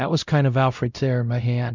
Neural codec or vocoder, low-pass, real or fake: codec, 16 kHz, 0.5 kbps, X-Codec, WavLM features, trained on Multilingual LibriSpeech; 7.2 kHz; fake